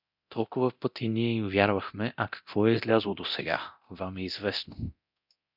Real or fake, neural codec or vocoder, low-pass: fake; codec, 24 kHz, 0.9 kbps, DualCodec; 5.4 kHz